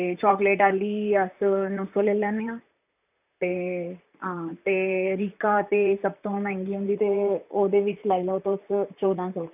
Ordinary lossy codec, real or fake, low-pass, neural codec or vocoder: none; fake; 3.6 kHz; vocoder, 44.1 kHz, 128 mel bands, Pupu-Vocoder